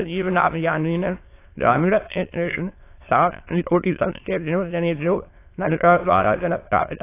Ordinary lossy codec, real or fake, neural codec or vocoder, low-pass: AAC, 24 kbps; fake; autoencoder, 22.05 kHz, a latent of 192 numbers a frame, VITS, trained on many speakers; 3.6 kHz